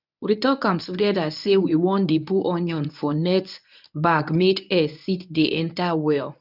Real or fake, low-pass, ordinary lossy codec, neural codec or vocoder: fake; 5.4 kHz; none; codec, 24 kHz, 0.9 kbps, WavTokenizer, medium speech release version 1